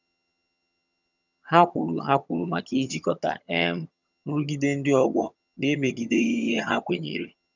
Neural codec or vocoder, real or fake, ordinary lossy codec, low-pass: vocoder, 22.05 kHz, 80 mel bands, HiFi-GAN; fake; none; 7.2 kHz